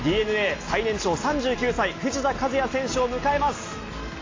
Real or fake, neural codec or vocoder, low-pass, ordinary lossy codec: real; none; 7.2 kHz; AAC, 32 kbps